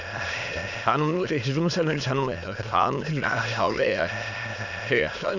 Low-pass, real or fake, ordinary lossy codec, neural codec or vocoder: 7.2 kHz; fake; none; autoencoder, 22.05 kHz, a latent of 192 numbers a frame, VITS, trained on many speakers